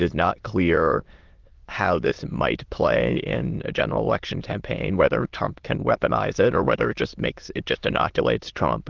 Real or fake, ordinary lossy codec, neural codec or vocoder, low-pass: fake; Opus, 16 kbps; autoencoder, 22.05 kHz, a latent of 192 numbers a frame, VITS, trained on many speakers; 7.2 kHz